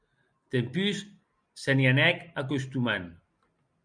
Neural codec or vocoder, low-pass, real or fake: none; 9.9 kHz; real